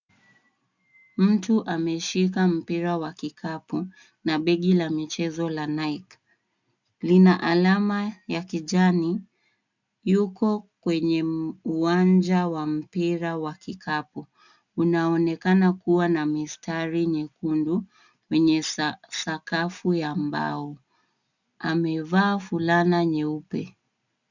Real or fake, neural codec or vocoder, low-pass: real; none; 7.2 kHz